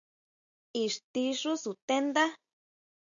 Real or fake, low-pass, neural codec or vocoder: real; 7.2 kHz; none